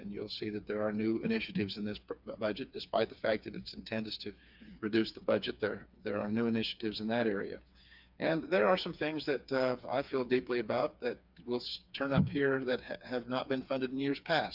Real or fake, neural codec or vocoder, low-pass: fake; codec, 16 kHz, 4 kbps, FreqCodec, smaller model; 5.4 kHz